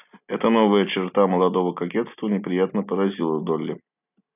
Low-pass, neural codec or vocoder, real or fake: 3.6 kHz; none; real